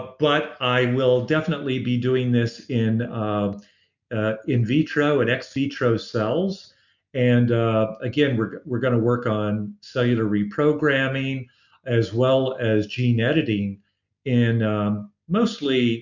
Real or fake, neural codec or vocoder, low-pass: real; none; 7.2 kHz